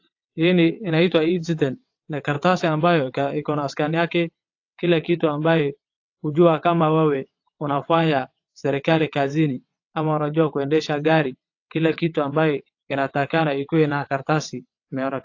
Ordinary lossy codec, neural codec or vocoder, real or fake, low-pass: AAC, 48 kbps; vocoder, 22.05 kHz, 80 mel bands, WaveNeXt; fake; 7.2 kHz